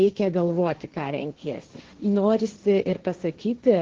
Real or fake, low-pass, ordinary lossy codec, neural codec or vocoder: fake; 7.2 kHz; Opus, 16 kbps; codec, 16 kHz, 1.1 kbps, Voila-Tokenizer